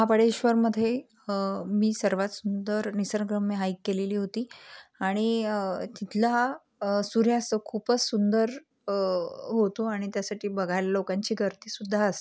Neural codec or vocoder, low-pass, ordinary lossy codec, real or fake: none; none; none; real